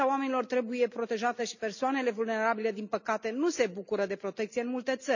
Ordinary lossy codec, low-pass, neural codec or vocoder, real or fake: none; 7.2 kHz; none; real